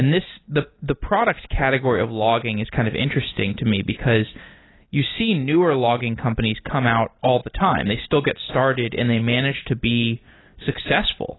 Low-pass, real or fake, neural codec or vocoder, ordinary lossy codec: 7.2 kHz; real; none; AAC, 16 kbps